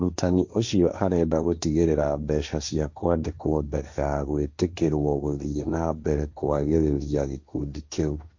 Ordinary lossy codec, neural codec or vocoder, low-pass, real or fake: MP3, 64 kbps; codec, 16 kHz, 1.1 kbps, Voila-Tokenizer; 7.2 kHz; fake